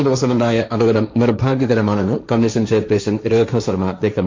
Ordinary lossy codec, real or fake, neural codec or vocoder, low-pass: MP3, 48 kbps; fake; codec, 16 kHz, 1.1 kbps, Voila-Tokenizer; 7.2 kHz